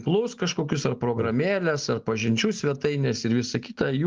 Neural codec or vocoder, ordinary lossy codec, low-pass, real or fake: none; Opus, 32 kbps; 7.2 kHz; real